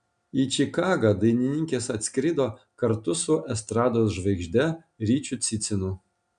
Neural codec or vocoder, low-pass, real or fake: none; 9.9 kHz; real